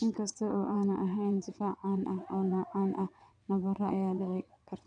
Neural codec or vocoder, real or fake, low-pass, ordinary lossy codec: vocoder, 22.05 kHz, 80 mel bands, WaveNeXt; fake; 9.9 kHz; none